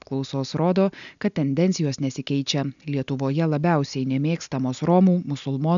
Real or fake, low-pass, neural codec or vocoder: real; 7.2 kHz; none